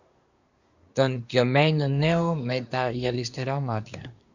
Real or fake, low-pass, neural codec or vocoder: fake; 7.2 kHz; codec, 24 kHz, 1 kbps, SNAC